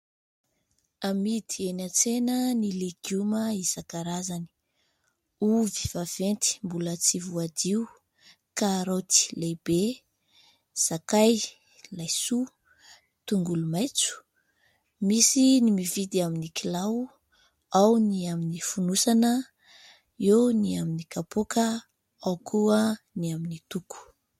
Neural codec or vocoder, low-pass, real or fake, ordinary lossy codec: none; 19.8 kHz; real; MP3, 64 kbps